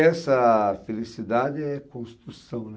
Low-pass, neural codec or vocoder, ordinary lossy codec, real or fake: none; none; none; real